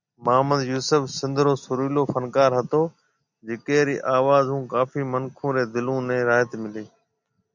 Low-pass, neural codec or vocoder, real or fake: 7.2 kHz; none; real